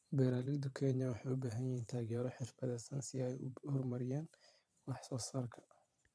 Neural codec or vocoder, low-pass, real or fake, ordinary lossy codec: vocoder, 22.05 kHz, 80 mel bands, Vocos; none; fake; none